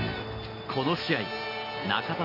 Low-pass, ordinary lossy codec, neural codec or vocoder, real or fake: 5.4 kHz; none; none; real